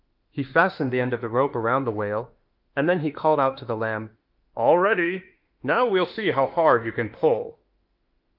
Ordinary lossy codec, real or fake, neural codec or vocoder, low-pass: Opus, 32 kbps; fake; autoencoder, 48 kHz, 32 numbers a frame, DAC-VAE, trained on Japanese speech; 5.4 kHz